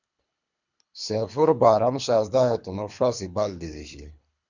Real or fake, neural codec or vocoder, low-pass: fake; codec, 24 kHz, 3 kbps, HILCodec; 7.2 kHz